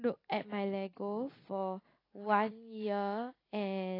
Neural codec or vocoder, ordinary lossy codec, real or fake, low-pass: none; AAC, 24 kbps; real; 5.4 kHz